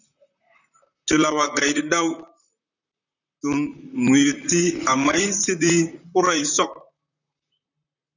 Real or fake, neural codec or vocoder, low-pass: fake; vocoder, 44.1 kHz, 128 mel bands, Pupu-Vocoder; 7.2 kHz